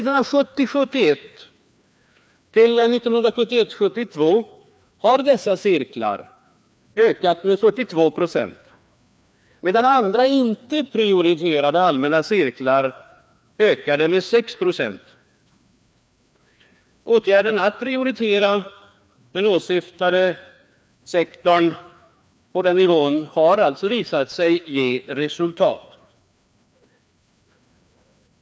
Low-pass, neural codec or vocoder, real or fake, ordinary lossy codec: none; codec, 16 kHz, 2 kbps, FreqCodec, larger model; fake; none